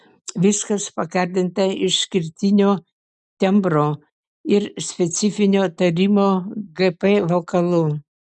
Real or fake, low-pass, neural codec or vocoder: real; 10.8 kHz; none